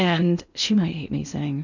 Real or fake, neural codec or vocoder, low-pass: fake; codec, 16 kHz in and 24 kHz out, 0.8 kbps, FocalCodec, streaming, 65536 codes; 7.2 kHz